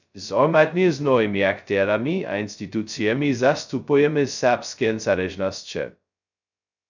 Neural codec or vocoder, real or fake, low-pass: codec, 16 kHz, 0.2 kbps, FocalCodec; fake; 7.2 kHz